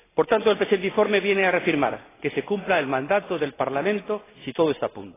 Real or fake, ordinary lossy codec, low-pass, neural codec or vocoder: real; AAC, 16 kbps; 3.6 kHz; none